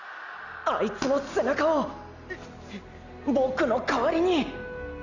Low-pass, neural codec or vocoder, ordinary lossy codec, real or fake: 7.2 kHz; none; none; real